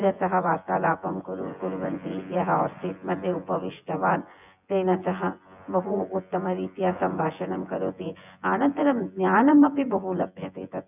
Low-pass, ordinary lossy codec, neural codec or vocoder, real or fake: 3.6 kHz; none; vocoder, 24 kHz, 100 mel bands, Vocos; fake